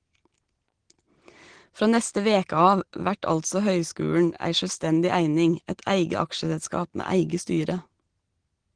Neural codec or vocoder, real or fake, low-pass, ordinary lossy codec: none; real; 9.9 kHz; Opus, 16 kbps